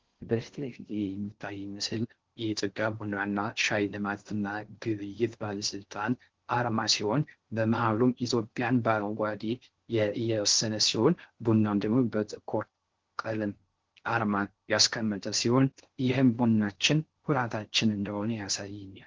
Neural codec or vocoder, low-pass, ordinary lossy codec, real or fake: codec, 16 kHz in and 24 kHz out, 0.6 kbps, FocalCodec, streaming, 4096 codes; 7.2 kHz; Opus, 16 kbps; fake